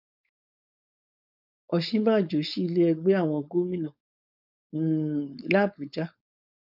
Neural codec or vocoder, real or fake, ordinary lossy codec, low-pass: codec, 16 kHz, 4.8 kbps, FACodec; fake; none; 5.4 kHz